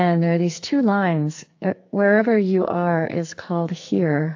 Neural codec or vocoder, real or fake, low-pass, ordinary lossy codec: codec, 44.1 kHz, 2.6 kbps, SNAC; fake; 7.2 kHz; AAC, 48 kbps